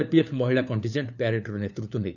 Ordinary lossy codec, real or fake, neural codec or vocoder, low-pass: none; fake; codec, 16 kHz, 4 kbps, FunCodec, trained on LibriTTS, 50 frames a second; 7.2 kHz